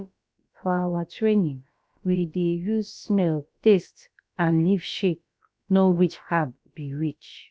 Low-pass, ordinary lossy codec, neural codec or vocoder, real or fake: none; none; codec, 16 kHz, about 1 kbps, DyCAST, with the encoder's durations; fake